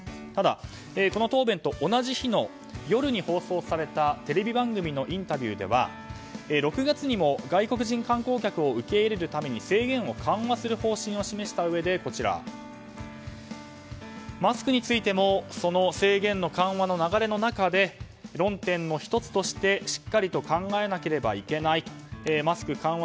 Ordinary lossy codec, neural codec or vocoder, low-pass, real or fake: none; none; none; real